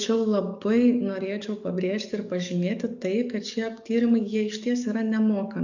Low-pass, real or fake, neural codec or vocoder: 7.2 kHz; fake; codec, 44.1 kHz, 7.8 kbps, DAC